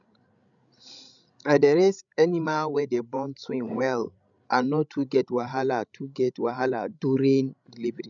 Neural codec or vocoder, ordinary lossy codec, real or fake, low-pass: codec, 16 kHz, 16 kbps, FreqCodec, larger model; none; fake; 7.2 kHz